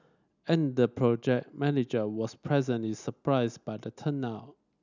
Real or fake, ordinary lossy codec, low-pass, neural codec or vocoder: real; none; 7.2 kHz; none